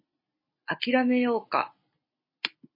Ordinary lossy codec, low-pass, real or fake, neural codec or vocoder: MP3, 24 kbps; 5.4 kHz; real; none